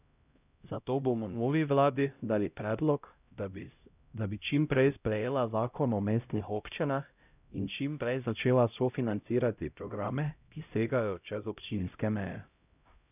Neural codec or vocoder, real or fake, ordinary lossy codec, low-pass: codec, 16 kHz, 0.5 kbps, X-Codec, HuBERT features, trained on LibriSpeech; fake; none; 3.6 kHz